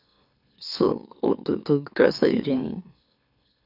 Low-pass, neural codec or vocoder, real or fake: 5.4 kHz; autoencoder, 44.1 kHz, a latent of 192 numbers a frame, MeloTTS; fake